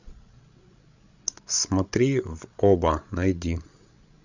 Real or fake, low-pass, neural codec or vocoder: fake; 7.2 kHz; codec, 16 kHz, 16 kbps, FreqCodec, larger model